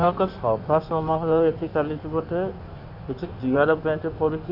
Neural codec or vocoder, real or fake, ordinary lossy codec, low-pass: codec, 16 kHz in and 24 kHz out, 1.1 kbps, FireRedTTS-2 codec; fake; none; 5.4 kHz